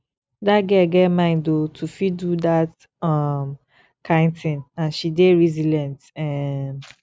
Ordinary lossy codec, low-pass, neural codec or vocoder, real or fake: none; none; none; real